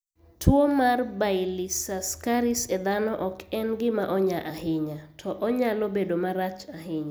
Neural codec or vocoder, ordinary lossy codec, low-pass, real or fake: none; none; none; real